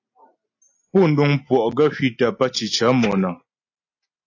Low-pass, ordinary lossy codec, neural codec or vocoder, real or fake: 7.2 kHz; AAC, 48 kbps; none; real